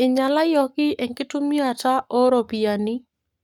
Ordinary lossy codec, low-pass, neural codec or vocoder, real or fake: none; 19.8 kHz; codec, 44.1 kHz, 7.8 kbps, Pupu-Codec; fake